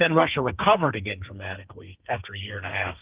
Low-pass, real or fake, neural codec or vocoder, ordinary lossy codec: 3.6 kHz; fake; codec, 32 kHz, 1.9 kbps, SNAC; Opus, 32 kbps